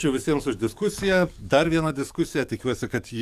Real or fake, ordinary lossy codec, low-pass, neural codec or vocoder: fake; AAC, 96 kbps; 14.4 kHz; codec, 44.1 kHz, 7.8 kbps, DAC